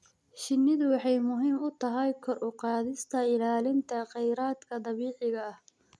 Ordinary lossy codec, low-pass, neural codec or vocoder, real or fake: none; none; none; real